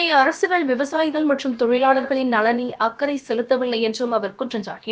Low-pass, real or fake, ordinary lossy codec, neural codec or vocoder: none; fake; none; codec, 16 kHz, about 1 kbps, DyCAST, with the encoder's durations